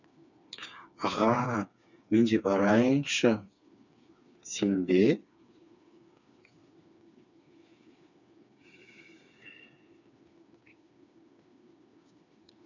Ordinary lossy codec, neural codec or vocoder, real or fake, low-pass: none; codec, 16 kHz, 4 kbps, FreqCodec, smaller model; fake; 7.2 kHz